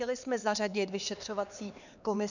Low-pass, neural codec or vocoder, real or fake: 7.2 kHz; codec, 16 kHz, 4 kbps, X-Codec, HuBERT features, trained on LibriSpeech; fake